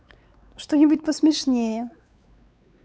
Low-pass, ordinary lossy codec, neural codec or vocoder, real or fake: none; none; codec, 16 kHz, 4 kbps, X-Codec, WavLM features, trained on Multilingual LibriSpeech; fake